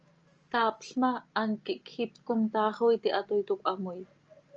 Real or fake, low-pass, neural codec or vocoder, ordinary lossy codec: real; 7.2 kHz; none; Opus, 24 kbps